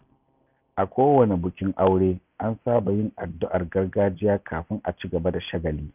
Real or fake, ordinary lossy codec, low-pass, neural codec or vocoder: real; none; 3.6 kHz; none